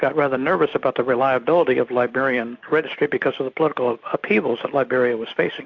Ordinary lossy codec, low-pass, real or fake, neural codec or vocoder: AAC, 48 kbps; 7.2 kHz; real; none